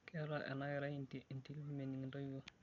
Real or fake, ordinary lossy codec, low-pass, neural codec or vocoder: real; none; 7.2 kHz; none